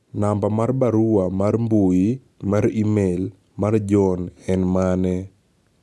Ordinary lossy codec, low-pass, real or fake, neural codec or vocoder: none; none; real; none